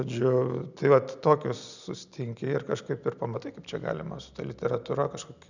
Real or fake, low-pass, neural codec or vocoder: real; 7.2 kHz; none